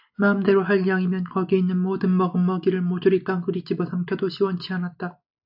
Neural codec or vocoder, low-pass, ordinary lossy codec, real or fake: none; 5.4 kHz; AAC, 48 kbps; real